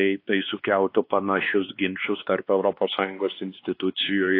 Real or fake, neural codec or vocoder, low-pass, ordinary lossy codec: fake; codec, 16 kHz, 2 kbps, X-Codec, WavLM features, trained on Multilingual LibriSpeech; 5.4 kHz; AAC, 32 kbps